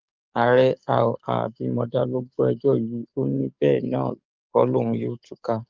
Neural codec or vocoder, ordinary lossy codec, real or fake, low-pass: codec, 44.1 kHz, 7.8 kbps, DAC; Opus, 24 kbps; fake; 7.2 kHz